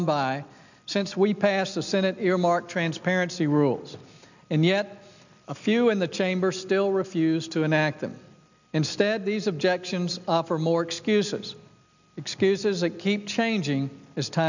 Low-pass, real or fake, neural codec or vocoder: 7.2 kHz; real; none